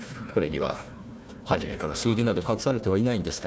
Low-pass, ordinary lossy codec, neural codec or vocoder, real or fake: none; none; codec, 16 kHz, 1 kbps, FunCodec, trained on Chinese and English, 50 frames a second; fake